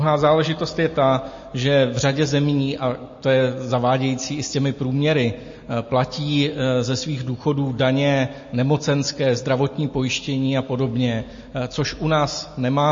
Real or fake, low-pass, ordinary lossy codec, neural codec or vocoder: real; 7.2 kHz; MP3, 32 kbps; none